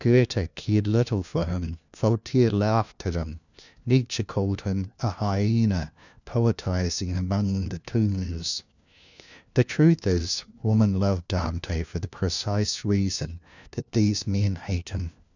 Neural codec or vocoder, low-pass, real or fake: codec, 16 kHz, 1 kbps, FunCodec, trained on LibriTTS, 50 frames a second; 7.2 kHz; fake